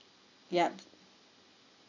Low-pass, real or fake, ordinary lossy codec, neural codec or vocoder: 7.2 kHz; real; none; none